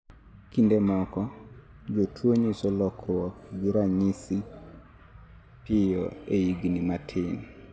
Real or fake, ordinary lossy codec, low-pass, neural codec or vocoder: real; none; none; none